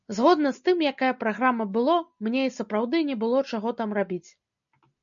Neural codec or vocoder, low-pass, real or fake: none; 7.2 kHz; real